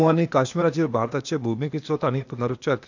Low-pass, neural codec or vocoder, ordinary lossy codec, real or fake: 7.2 kHz; codec, 16 kHz, 0.8 kbps, ZipCodec; none; fake